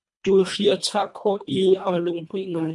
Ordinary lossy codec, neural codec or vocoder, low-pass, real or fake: MP3, 64 kbps; codec, 24 kHz, 1.5 kbps, HILCodec; 10.8 kHz; fake